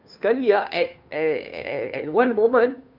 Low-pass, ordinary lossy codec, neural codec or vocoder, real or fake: 5.4 kHz; none; codec, 16 kHz, 2 kbps, FunCodec, trained on Chinese and English, 25 frames a second; fake